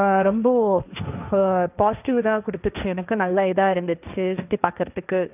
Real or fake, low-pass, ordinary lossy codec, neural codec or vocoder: fake; 3.6 kHz; none; codec, 16 kHz, 1.1 kbps, Voila-Tokenizer